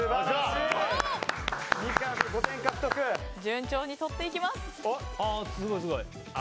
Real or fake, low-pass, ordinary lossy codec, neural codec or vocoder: real; none; none; none